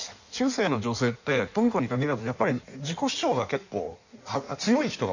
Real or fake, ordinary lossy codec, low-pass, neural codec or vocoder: fake; none; 7.2 kHz; codec, 16 kHz in and 24 kHz out, 1.1 kbps, FireRedTTS-2 codec